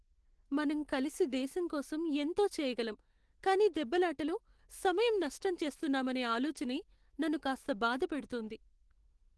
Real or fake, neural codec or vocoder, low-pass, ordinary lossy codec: fake; autoencoder, 48 kHz, 128 numbers a frame, DAC-VAE, trained on Japanese speech; 10.8 kHz; Opus, 16 kbps